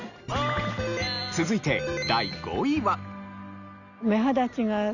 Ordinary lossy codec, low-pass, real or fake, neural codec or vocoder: none; 7.2 kHz; real; none